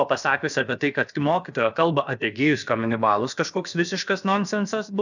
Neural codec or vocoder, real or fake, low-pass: codec, 16 kHz, about 1 kbps, DyCAST, with the encoder's durations; fake; 7.2 kHz